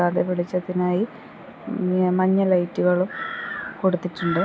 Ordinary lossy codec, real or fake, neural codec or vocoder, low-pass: none; real; none; none